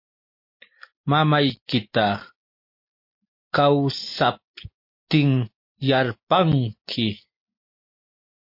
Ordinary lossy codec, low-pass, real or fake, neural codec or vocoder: MP3, 32 kbps; 5.4 kHz; real; none